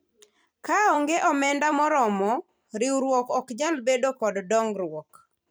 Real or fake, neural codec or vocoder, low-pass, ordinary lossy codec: fake; vocoder, 44.1 kHz, 128 mel bands every 256 samples, BigVGAN v2; none; none